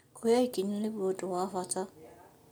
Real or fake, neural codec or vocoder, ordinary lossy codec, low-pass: real; none; none; none